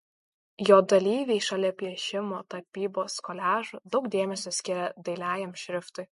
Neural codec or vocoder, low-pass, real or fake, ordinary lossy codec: none; 14.4 kHz; real; MP3, 48 kbps